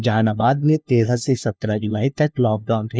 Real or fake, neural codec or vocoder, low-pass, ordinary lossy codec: fake; codec, 16 kHz, 1 kbps, FunCodec, trained on LibriTTS, 50 frames a second; none; none